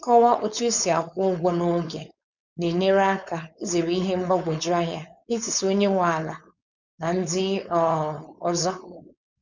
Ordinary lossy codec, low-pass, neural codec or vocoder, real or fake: none; 7.2 kHz; codec, 16 kHz, 4.8 kbps, FACodec; fake